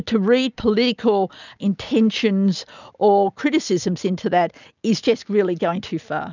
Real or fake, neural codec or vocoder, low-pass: real; none; 7.2 kHz